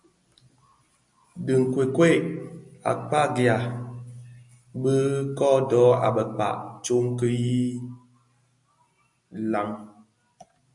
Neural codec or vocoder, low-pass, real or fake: none; 10.8 kHz; real